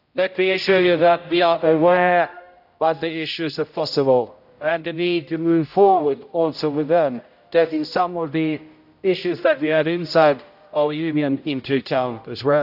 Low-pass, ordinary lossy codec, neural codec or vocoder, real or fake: 5.4 kHz; AAC, 48 kbps; codec, 16 kHz, 0.5 kbps, X-Codec, HuBERT features, trained on general audio; fake